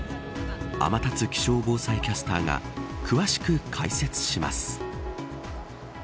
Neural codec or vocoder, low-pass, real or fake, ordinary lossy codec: none; none; real; none